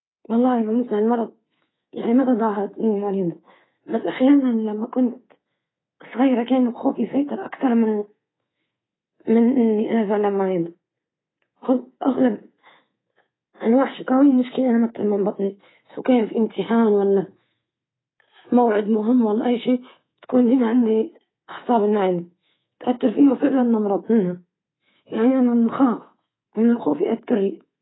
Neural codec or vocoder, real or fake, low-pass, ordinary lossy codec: codec, 24 kHz, 3.1 kbps, DualCodec; fake; 7.2 kHz; AAC, 16 kbps